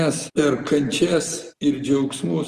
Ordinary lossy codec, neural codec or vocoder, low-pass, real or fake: Opus, 24 kbps; none; 14.4 kHz; real